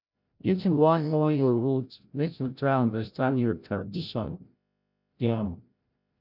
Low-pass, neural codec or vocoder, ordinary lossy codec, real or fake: 5.4 kHz; codec, 16 kHz, 0.5 kbps, FreqCodec, larger model; none; fake